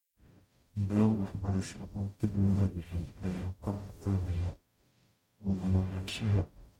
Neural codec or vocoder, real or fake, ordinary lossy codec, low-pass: codec, 44.1 kHz, 0.9 kbps, DAC; fake; MP3, 64 kbps; 19.8 kHz